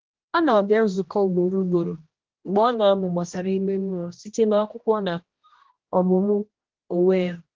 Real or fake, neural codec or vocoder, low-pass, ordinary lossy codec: fake; codec, 16 kHz, 1 kbps, X-Codec, HuBERT features, trained on general audio; 7.2 kHz; Opus, 16 kbps